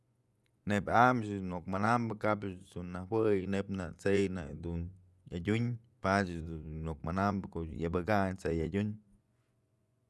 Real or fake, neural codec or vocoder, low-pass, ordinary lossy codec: fake; vocoder, 24 kHz, 100 mel bands, Vocos; none; none